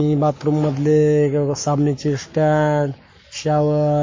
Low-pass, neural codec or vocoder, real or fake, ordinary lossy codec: 7.2 kHz; codec, 44.1 kHz, 7.8 kbps, Pupu-Codec; fake; MP3, 32 kbps